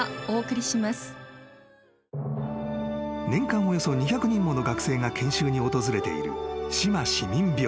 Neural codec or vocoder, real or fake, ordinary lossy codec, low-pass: none; real; none; none